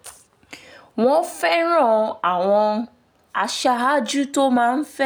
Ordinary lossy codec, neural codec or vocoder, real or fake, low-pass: none; none; real; none